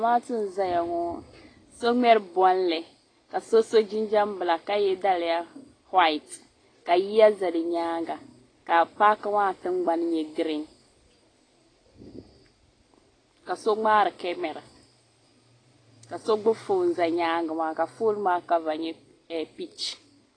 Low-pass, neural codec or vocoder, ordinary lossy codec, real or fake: 9.9 kHz; none; AAC, 32 kbps; real